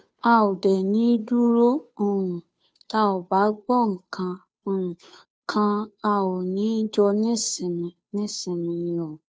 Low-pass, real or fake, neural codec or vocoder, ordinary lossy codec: none; fake; codec, 16 kHz, 2 kbps, FunCodec, trained on Chinese and English, 25 frames a second; none